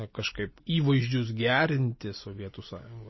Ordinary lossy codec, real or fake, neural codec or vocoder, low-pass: MP3, 24 kbps; real; none; 7.2 kHz